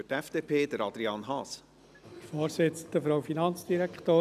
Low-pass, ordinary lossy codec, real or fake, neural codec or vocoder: 14.4 kHz; none; real; none